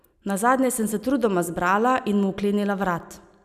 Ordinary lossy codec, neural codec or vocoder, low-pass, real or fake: none; none; 14.4 kHz; real